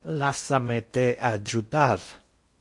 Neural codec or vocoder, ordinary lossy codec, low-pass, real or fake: codec, 16 kHz in and 24 kHz out, 0.8 kbps, FocalCodec, streaming, 65536 codes; MP3, 48 kbps; 10.8 kHz; fake